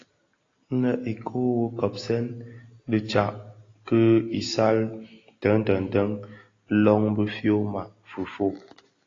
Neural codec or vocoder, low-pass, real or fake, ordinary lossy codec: none; 7.2 kHz; real; AAC, 32 kbps